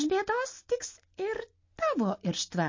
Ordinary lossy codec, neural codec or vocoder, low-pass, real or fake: MP3, 32 kbps; none; 7.2 kHz; real